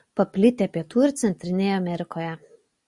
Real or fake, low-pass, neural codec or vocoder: real; 10.8 kHz; none